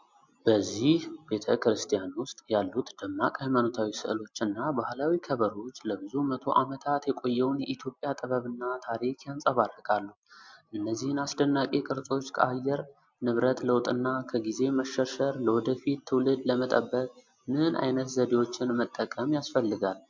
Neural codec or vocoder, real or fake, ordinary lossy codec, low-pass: none; real; MP3, 64 kbps; 7.2 kHz